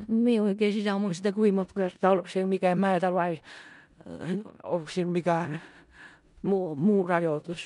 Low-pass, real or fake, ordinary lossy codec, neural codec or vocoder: 10.8 kHz; fake; none; codec, 16 kHz in and 24 kHz out, 0.4 kbps, LongCat-Audio-Codec, four codebook decoder